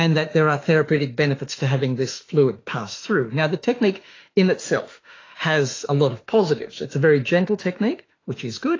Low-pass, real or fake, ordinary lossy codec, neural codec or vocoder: 7.2 kHz; fake; AAC, 32 kbps; autoencoder, 48 kHz, 32 numbers a frame, DAC-VAE, trained on Japanese speech